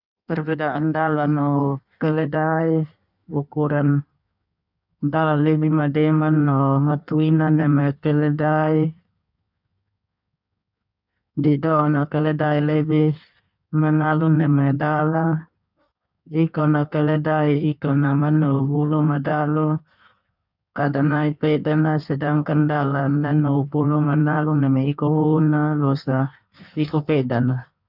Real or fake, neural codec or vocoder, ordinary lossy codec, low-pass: fake; codec, 16 kHz in and 24 kHz out, 1.1 kbps, FireRedTTS-2 codec; none; 5.4 kHz